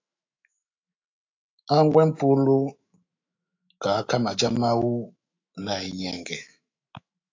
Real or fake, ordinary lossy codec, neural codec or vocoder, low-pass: fake; AAC, 48 kbps; autoencoder, 48 kHz, 128 numbers a frame, DAC-VAE, trained on Japanese speech; 7.2 kHz